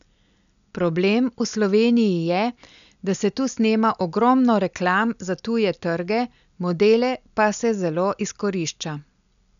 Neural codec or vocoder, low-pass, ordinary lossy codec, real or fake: none; 7.2 kHz; none; real